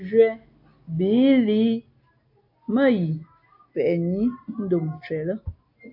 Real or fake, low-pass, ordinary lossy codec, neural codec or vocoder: real; 5.4 kHz; AAC, 48 kbps; none